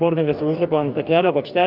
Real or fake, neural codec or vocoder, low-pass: fake; codec, 44.1 kHz, 2.6 kbps, DAC; 5.4 kHz